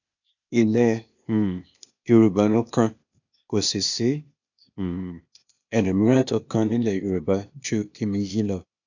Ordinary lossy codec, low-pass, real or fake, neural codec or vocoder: none; 7.2 kHz; fake; codec, 16 kHz, 0.8 kbps, ZipCodec